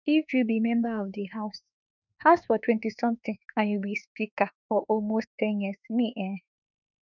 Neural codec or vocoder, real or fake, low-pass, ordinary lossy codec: autoencoder, 48 kHz, 32 numbers a frame, DAC-VAE, trained on Japanese speech; fake; 7.2 kHz; none